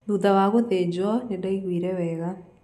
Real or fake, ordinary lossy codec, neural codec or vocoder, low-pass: real; none; none; 14.4 kHz